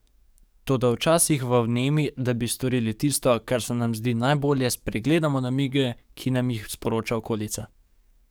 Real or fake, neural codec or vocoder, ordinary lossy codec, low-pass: fake; codec, 44.1 kHz, 7.8 kbps, DAC; none; none